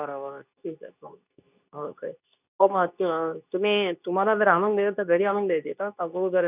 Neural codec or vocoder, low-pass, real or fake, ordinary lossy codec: codec, 24 kHz, 0.9 kbps, WavTokenizer, medium speech release version 2; 3.6 kHz; fake; none